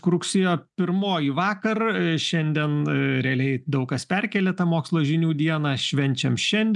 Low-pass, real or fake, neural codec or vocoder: 10.8 kHz; real; none